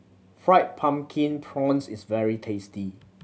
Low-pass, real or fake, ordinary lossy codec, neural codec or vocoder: none; real; none; none